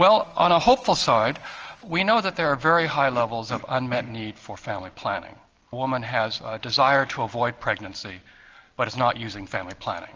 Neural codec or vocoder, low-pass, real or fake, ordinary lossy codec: none; 7.2 kHz; real; Opus, 24 kbps